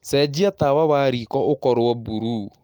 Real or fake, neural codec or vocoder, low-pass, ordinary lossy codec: real; none; 19.8 kHz; Opus, 32 kbps